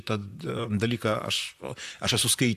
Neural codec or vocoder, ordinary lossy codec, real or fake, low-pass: vocoder, 44.1 kHz, 128 mel bands, Pupu-Vocoder; AAC, 96 kbps; fake; 14.4 kHz